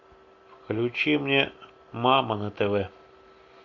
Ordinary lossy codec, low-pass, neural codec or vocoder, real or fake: AAC, 48 kbps; 7.2 kHz; none; real